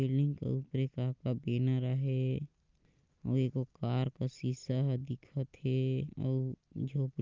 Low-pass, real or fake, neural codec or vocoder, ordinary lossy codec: 7.2 kHz; real; none; Opus, 32 kbps